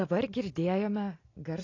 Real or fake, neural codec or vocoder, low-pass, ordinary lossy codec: real; none; 7.2 kHz; AAC, 32 kbps